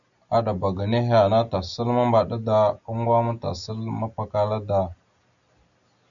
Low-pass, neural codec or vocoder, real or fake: 7.2 kHz; none; real